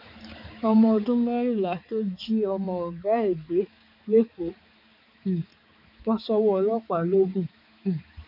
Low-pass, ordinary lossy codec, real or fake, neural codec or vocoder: 5.4 kHz; none; fake; codec, 16 kHz, 4 kbps, X-Codec, HuBERT features, trained on balanced general audio